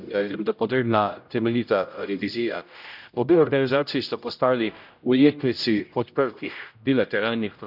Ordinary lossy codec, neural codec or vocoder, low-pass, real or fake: none; codec, 16 kHz, 0.5 kbps, X-Codec, HuBERT features, trained on general audio; 5.4 kHz; fake